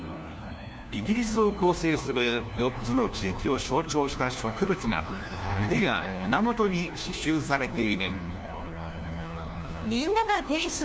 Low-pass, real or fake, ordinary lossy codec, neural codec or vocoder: none; fake; none; codec, 16 kHz, 1 kbps, FunCodec, trained on LibriTTS, 50 frames a second